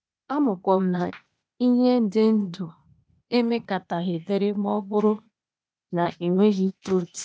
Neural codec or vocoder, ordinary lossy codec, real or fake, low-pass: codec, 16 kHz, 0.8 kbps, ZipCodec; none; fake; none